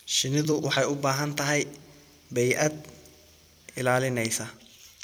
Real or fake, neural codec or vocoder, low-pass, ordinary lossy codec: fake; vocoder, 44.1 kHz, 128 mel bands every 256 samples, BigVGAN v2; none; none